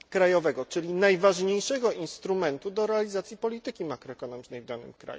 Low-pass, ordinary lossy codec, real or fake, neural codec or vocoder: none; none; real; none